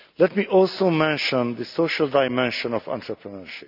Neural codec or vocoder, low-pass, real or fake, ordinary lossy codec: none; 5.4 kHz; real; none